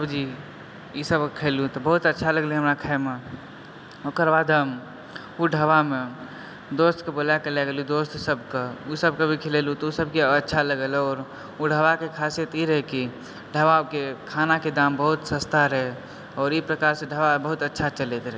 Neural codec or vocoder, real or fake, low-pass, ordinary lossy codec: none; real; none; none